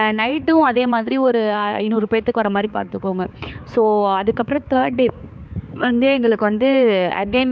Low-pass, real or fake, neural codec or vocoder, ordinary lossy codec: none; fake; codec, 16 kHz, 4 kbps, X-Codec, HuBERT features, trained on balanced general audio; none